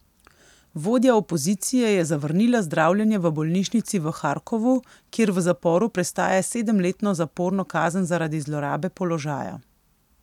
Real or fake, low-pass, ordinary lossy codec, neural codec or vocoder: real; 19.8 kHz; none; none